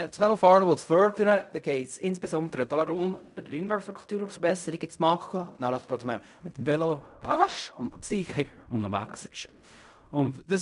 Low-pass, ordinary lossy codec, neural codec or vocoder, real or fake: 10.8 kHz; none; codec, 16 kHz in and 24 kHz out, 0.4 kbps, LongCat-Audio-Codec, fine tuned four codebook decoder; fake